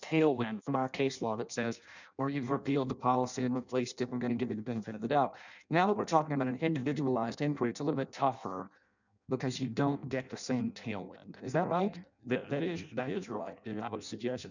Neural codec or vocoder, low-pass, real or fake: codec, 16 kHz in and 24 kHz out, 0.6 kbps, FireRedTTS-2 codec; 7.2 kHz; fake